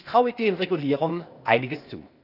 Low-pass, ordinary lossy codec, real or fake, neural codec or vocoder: 5.4 kHz; none; fake; codec, 16 kHz, 0.8 kbps, ZipCodec